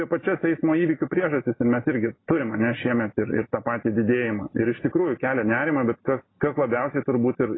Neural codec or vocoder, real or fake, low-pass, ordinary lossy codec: none; real; 7.2 kHz; AAC, 16 kbps